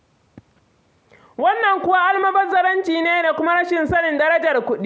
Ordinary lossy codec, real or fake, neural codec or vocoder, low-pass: none; real; none; none